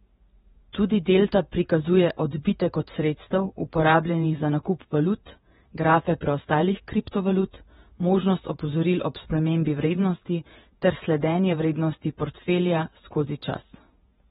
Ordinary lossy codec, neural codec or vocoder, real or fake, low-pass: AAC, 16 kbps; vocoder, 48 kHz, 128 mel bands, Vocos; fake; 19.8 kHz